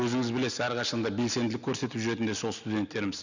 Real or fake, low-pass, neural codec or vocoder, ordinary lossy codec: real; 7.2 kHz; none; none